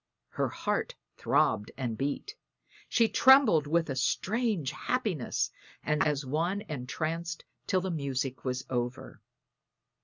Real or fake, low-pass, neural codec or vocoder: real; 7.2 kHz; none